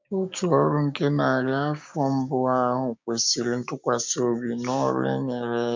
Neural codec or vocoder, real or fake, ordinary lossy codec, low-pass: codec, 16 kHz, 6 kbps, DAC; fake; MP3, 64 kbps; 7.2 kHz